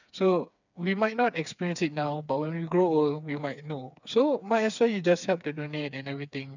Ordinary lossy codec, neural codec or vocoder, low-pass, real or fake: none; codec, 16 kHz, 4 kbps, FreqCodec, smaller model; 7.2 kHz; fake